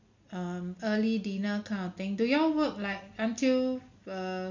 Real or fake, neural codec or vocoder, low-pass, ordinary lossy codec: real; none; 7.2 kHz; MP3, 48 kbps